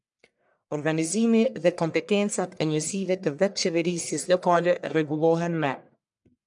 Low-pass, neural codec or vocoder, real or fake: 10.8 kHz; codec, 44.1 kHz, 1.7 kbps, Pupu-Codec; fake